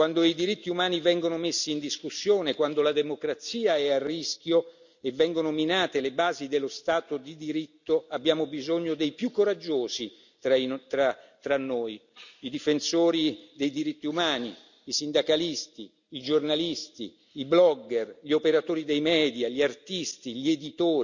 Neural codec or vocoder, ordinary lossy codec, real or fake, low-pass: none; none; real; 7.2 kHz